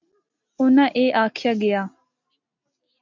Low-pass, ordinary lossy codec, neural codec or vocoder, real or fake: 7.2 kHz; MP3, 64 kbps; none; real